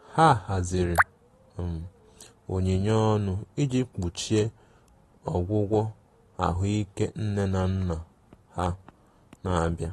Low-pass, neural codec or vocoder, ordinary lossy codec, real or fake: 19.8 kHz; none; AAC, 32 kbps; real